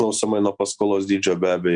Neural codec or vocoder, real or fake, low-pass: none; real; 9.9 kHz